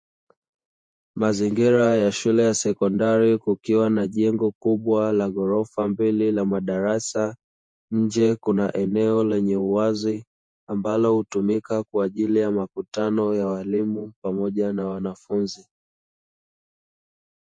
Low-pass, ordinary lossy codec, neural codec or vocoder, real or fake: 9.9 kHz; MP3, 48 kbps; vocoder, 48 kHz, 128 mel bands, Vocos; fake